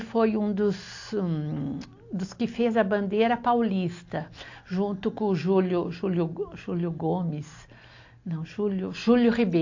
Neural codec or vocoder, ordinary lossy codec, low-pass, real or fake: none; AAC, 48 kbps; 7.2 kHz; real